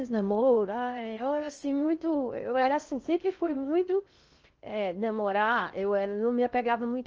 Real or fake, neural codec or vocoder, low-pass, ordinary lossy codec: fake; codec, 16 kHz in and 24 kHz out, 0.6 kbps, FocalCodec, streaming, 2048 codes; 7.2 kHz; Opus, 24 kbps